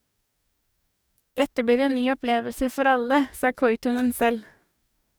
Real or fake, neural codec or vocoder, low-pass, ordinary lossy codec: fake; codec, 44.1 kHz, 2.6 kbps, DAC; none; none